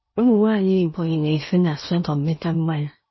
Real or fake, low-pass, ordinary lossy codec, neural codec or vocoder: fake; 7.2 kHz; MP3, 24 kbps; codec, 16 kHz in and 24 kHz out, 0.8 kbps, FocalCodec, streaming, 65536 codes